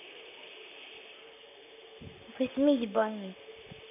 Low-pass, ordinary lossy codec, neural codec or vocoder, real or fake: 3.6 kHz; none; vocoder, 44.1 kHz, 128 mel bands, Pupu-Vocoder; fake